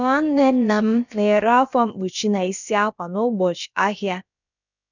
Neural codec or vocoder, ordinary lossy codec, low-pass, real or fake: codec, 16 kHz, about 1 kbps, DyCAST, with the encoder's durations; none; 7.2 kHz; fake